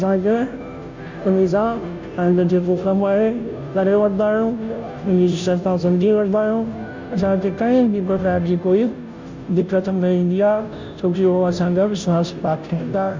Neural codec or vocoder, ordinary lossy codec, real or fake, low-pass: codec, 16 kHz, 0.5 kbps, FunCodec, trained on Chinese and English, 25 frames a second; MP3, 64 kbps; fake; 7.2 kHz